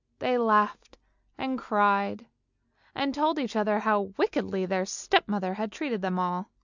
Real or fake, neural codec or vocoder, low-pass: real; none; 7.2 kHz